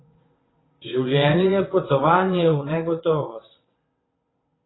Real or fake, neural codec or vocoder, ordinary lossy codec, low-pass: fake; vocoder, 22.05 kHz, 80 mel bands, WaveNeXt; AAC, 16 kbps; 7.2 kHz